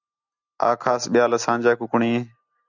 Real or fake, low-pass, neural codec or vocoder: real; 7.2 kHz; none